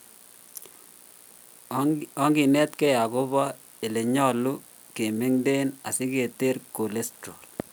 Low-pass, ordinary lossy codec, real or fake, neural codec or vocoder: none; none; real; none